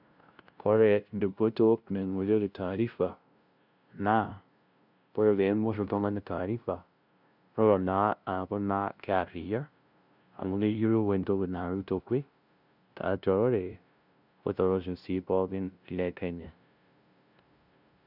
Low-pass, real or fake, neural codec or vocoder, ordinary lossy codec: 5.4 kHz; fake; codec, 16 kHz, 0.5 kbps, FunCodec, trained on LibriTTS, 25 frames a second; none